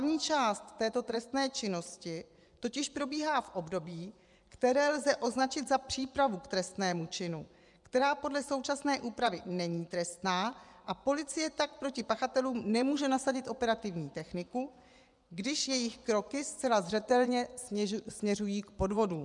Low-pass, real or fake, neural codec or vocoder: 10.8 kHz; fake; vocoder, 24 kHz, 100 mel bands, Vocos